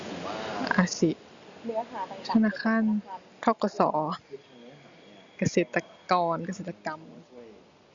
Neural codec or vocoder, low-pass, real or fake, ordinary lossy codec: none; 7.2 kHz; real; Opus, 64 kbps